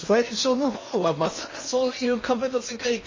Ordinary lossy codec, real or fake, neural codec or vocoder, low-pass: MP3, 32 kbps; fake; codec, 16 kHz in and 24 kHz out, 0.8 kbps, FocalCodec, streaming, 65536 codes; 7.2 kHz